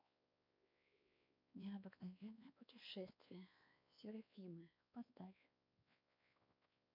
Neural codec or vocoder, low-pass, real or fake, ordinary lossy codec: codec, 16 kHz, 2 kbps, X-Codec, WavLM features, trained on Multilingual LibriSpeech; 5.4 kHz; fake; MP3, 48 kbps